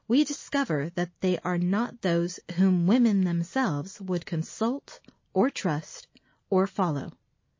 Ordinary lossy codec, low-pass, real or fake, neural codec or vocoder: MP3, 32 kbps; 7.2 kHz; real; none